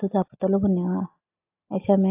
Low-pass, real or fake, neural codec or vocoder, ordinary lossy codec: 3.6 kHz; real; none; AAC, 24 kbps